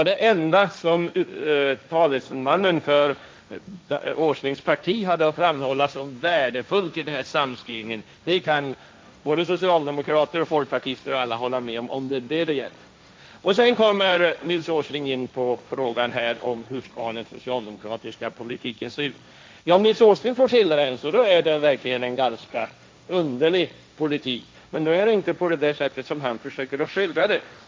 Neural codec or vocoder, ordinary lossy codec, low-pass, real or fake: codec, 16 kHz, 1.1 kbps, Voila-Tokenizer; none; none; fake